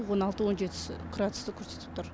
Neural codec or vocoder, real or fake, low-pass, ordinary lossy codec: none; real; none; none